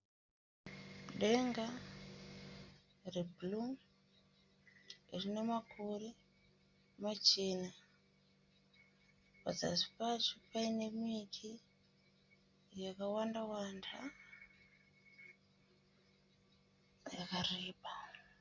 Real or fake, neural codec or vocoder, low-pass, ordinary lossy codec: real; none; 7.2 kHz; Opus, 64 kbps